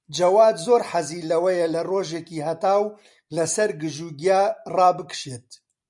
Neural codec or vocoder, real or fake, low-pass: none; real; 10.8 kHz